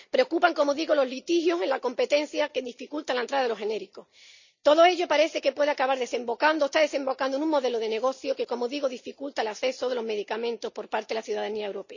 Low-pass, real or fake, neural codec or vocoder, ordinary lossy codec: 7.2 kHz; real; none; none